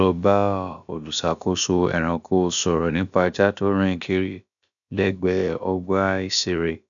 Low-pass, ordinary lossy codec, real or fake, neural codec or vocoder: 7.2 kHz; none; fake; codec, 16 kHz, about 1 kbps, DyCAST, with the encoder's durations